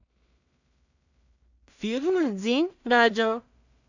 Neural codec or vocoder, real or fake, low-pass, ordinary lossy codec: codec, 16 kHz in and 24 kHz out, 0.4 kbps, LongCat-Audio-Codec, two codebook decoder; fake; 7.2 kHz; none